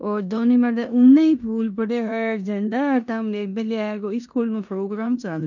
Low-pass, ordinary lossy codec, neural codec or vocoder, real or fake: 7.2 kHz; none; codec, 16 kHz in and 24 kHz out, 0.9 kbps, LongCat-Audio-Codec, four codebook decoder; fake